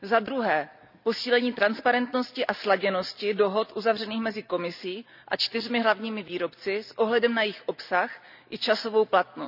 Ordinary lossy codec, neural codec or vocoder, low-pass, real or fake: none; none; 5.4 kHz; real